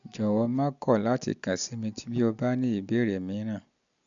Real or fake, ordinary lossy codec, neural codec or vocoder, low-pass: real; none; none; 7.2 kHz